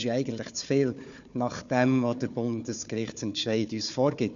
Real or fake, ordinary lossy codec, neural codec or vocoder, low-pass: fake; none; codec, 16 kHz, 4 kbps, FunCodec, trained on Chinese and English, 50 frames a second; 7.2 kHz